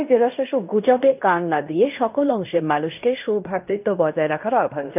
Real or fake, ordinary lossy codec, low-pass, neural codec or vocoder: fake; none; 3.6 kHz; codec, 16 kHz in and 24 kHz out, 0.9 kbps, LongCat-Audio-Codec, fine tuned four codebook decoder